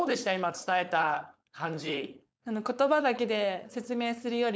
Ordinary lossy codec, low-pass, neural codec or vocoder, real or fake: none; none; codec, 16 kHz, 4.8 kbps, FACodec; fake